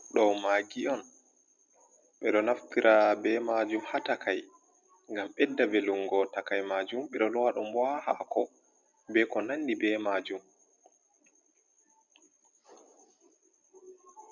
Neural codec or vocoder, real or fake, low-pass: none; real; 7.2 kHz